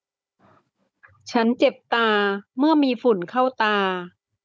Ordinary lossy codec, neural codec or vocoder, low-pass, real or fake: none; codec, 16 kHz, 16 kbps, FunCodec, trained on Chinese and English, 50 frames a second; none; fake